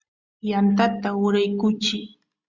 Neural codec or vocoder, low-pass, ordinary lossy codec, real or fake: none; 7.2 kHz; Opus, 64 kbps; real